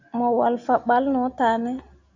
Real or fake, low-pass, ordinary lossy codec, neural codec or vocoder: real; 7.2 kHz; MP3, 64 kbps; none